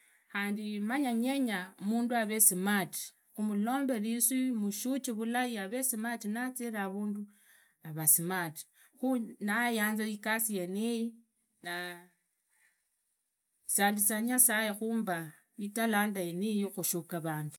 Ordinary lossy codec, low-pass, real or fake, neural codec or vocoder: none; none; real; none